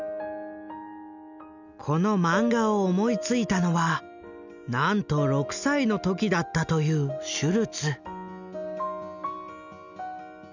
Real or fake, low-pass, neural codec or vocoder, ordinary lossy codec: real; 7.2 kHz; none; none